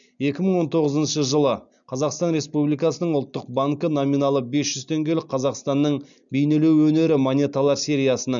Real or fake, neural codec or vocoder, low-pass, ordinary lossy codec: real; none; 7.2 kHz; none